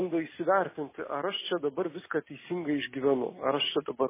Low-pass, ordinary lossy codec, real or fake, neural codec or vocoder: 3.6 kHz; MP3, 16 kbps; real; none